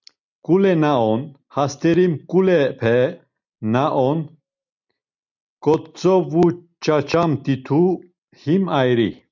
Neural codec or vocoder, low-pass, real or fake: none; 7.2 kHz; real